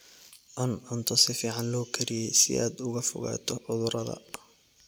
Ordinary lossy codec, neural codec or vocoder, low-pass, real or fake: none; none; none; real